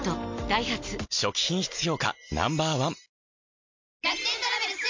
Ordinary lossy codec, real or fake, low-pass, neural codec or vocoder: MP3, 48 kbps; real; 7.2 kHz; none